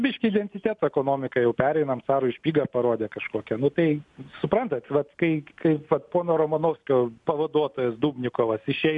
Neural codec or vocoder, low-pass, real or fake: none; 10.8 kHz; real